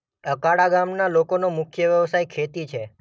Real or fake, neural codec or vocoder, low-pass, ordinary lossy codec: real; none; none; none